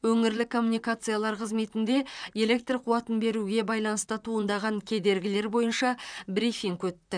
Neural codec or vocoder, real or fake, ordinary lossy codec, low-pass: vocoder, 44.1 kHz, 128 mel bands, Pupu-Vocoder; fake; none; 9.9 kHz